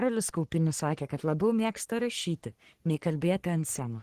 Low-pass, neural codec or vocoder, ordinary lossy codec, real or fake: 14.4 kHz; codec, 44.1 kHz, 3.4 kbps, Pupu-Codec; Opus, 16 kbps; fake